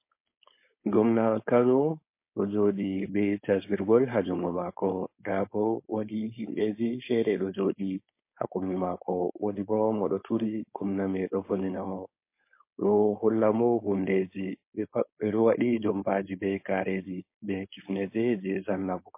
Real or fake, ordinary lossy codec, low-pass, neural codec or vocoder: fake; MP3, 24 kbps; 3.6 kHz; codec, 16 kHz, 4.8 kbps, FACodec